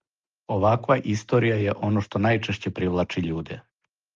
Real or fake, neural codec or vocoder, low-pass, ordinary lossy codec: real; none; 7.2 kHz; Opus, 32 kbps